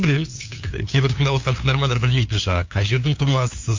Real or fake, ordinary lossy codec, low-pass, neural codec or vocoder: fake; MP3, 48 kbps; 7.2 kHz; codec, 16 kHz, 2 kbps, FunCodec, trained on LibriTTS, 25 frames a second